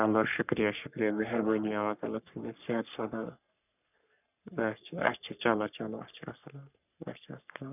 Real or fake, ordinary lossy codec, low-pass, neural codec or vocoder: fake; none; 3.6 kHz; codec, 44.1 kHz, 3.4 kbps, Pupu-Codec